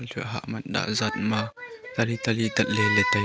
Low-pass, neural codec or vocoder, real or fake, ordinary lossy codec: none; none; real; none